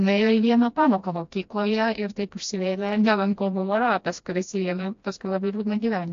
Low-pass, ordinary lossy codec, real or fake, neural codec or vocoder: 7.2 kHz; AAC, 48 kbps; fake; codec, 16 kHz, 1 kbps, FreqCodec, smaller model